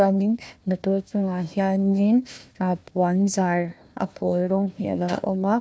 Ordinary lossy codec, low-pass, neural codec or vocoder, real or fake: none; none; codec, 16 kHz, 1 kbps, FunCodec, trained on Chinese and English, 50 frames a second; fake